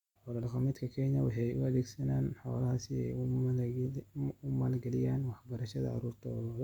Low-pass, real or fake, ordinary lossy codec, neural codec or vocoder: 19.8 kHz; real; Opus, 64 kbps; none